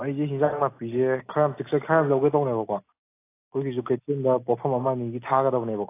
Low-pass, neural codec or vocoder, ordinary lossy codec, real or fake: 3.6 kHz; none; AAC, 24 kbps; real